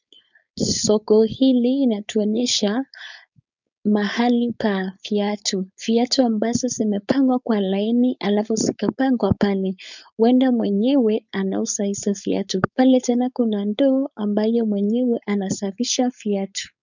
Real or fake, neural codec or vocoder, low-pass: fake; codec, 16 kHz, 4.8 kbps, FACodec; 7.2 kHz